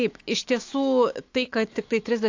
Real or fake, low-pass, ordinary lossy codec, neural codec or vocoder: fake; 7.2 kHz; AAC, 48 kbps; autoencoder, 48 kHz, 128 numbers a frame, DAC-VAE, trained on Japanese speech